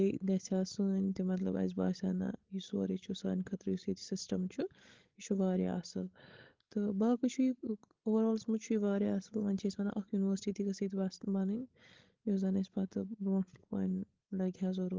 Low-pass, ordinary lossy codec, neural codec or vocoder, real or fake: 7.2 kHz; Opus, 16 kbps; codec, 16 kHz, 16 kbps, FreqCodec, larger model; fake